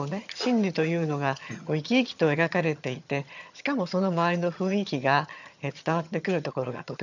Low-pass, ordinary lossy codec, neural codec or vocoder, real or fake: 7.2 kHz; none; vocoder, 22.05 kHz, 80 mel bands, HiFi-GAN; fake